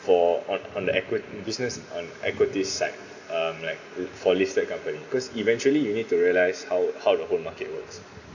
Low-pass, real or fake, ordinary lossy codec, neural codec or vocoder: 7.2 kHz; real; none; none